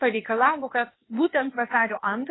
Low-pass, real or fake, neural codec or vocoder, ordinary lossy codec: 7.2 kHz; fake; codec, 16 kHz, 0.8 kbps, ZipCodec; AAC, 16 kbps